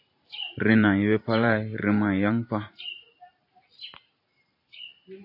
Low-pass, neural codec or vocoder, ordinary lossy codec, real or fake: 5.4 kHz; vocoder, 44.1 kHz, 128 mel bands every 512 samples, BigVGAN v2; AAC, 48 kbps; fake